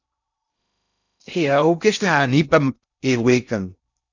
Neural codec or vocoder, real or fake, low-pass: codec, 16 kHz in and 24 kHz out, 0.6 kbps, FocalCodec, streaming, 2048 codes; fake; 7.2 kHz